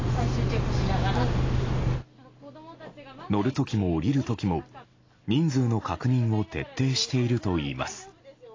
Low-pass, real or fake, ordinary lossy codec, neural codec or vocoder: 7.2 kHz; real; AAC, 32 kbps; none